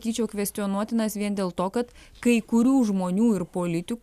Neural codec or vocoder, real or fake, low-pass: none; real; 14.4 kHz